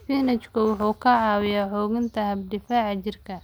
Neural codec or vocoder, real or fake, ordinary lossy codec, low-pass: none; real; none; none